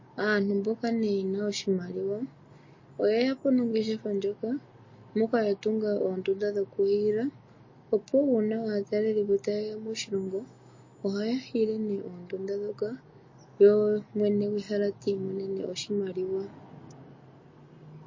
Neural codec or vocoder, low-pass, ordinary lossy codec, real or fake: none; 7.2 kHz; MP3, 32 kbps; real